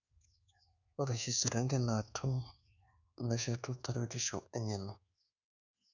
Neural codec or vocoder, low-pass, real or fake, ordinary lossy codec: codec, 24 kHz, 1.2 kbps, DualCodec; 7.2 kHz; fake; none